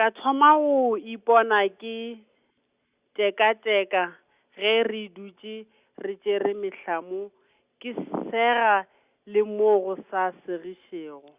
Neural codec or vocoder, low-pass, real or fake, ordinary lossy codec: none; 3.6 kHz; real; Opus, 64 kbps